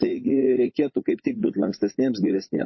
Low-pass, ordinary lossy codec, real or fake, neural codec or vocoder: 7.2 kHz; MP3, 24 kbps; fake; vocoder, 44.1 kHz, 80 mel bands, Vocos